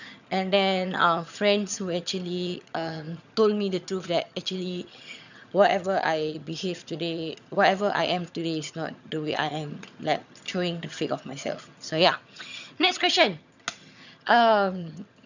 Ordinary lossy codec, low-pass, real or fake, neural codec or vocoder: none; 7.2 kHz; fake; vocoder, 22.05 kHz, 80 mel bands, HiFi-GAN